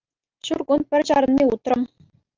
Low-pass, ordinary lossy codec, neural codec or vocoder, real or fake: 7.2 kHz; Opus, 24 kbps; none; real